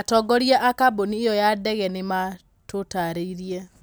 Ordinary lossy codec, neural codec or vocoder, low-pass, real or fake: none; none; none; real